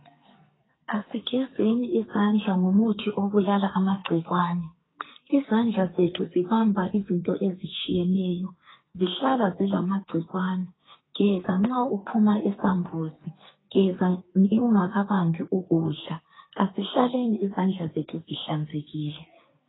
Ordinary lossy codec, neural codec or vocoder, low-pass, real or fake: AAC, 16 kbps; codec, 44.1 kHz, 2.6 kbps, SNAC; 7.2 kHz; fake